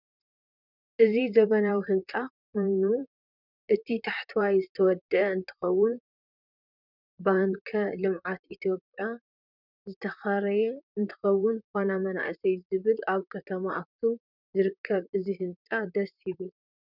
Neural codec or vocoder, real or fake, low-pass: vocoder, 44.1 kHz, 128 mel bands, Pupu-Vocoder; fake; 5.4 kHz